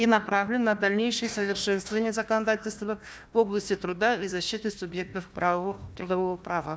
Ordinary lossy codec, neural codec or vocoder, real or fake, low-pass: none; codec, 16 kHz, 1 kbps, FunCodec, trained on Chinese and English, 50 frames a second; fake; none